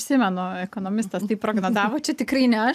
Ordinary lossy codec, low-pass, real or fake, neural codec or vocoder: AAC, 96 kbps; 14.4 kHz; real; none